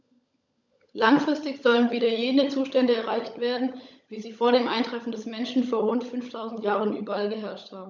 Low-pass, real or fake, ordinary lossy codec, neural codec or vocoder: 7.2 kHz; fake; none; codec, 16 kHz, 16 kbps, FunCodec, trained on LibriTTS, 50 frames a second